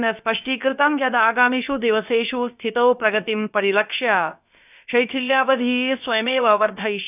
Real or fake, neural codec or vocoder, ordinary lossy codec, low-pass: fake; codec, 16 kHz, about 1 kbps, DyCAST, with the encoder's durations; none; 3.6 kHz